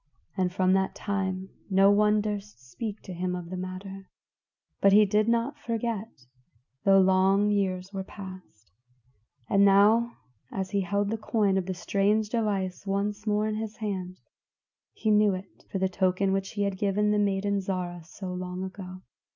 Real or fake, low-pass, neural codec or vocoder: real; 7.2 kHz; none